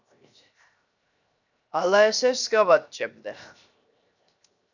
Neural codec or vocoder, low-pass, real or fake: codec, 16 kHz, 0.7 kbps, FocalCodec; 7.2 kHz; fake